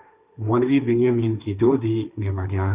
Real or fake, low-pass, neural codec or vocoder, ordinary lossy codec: fake; 3.6 kHz; codec, 16 kHz, 1.1 kbps, Voila-Tokenizer; Opus, 64 kbps